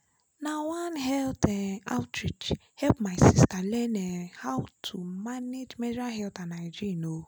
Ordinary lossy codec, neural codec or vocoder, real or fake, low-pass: none; none; real; none